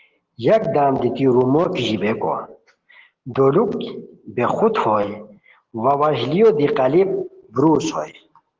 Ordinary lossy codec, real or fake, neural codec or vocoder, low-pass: Opus, 16 kbps; real; none; 7.2 kHz